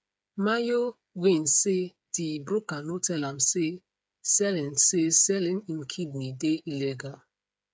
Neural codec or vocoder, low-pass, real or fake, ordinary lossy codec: codec, 16 kHz, 8 kbps, FreqCodec, smaller model; none; fake; none